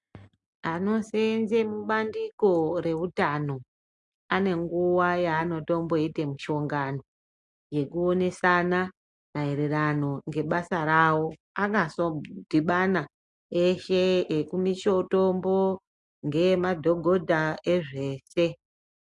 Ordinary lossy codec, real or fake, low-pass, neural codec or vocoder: MP3, 64 kbps; real; 10.8 kHz; none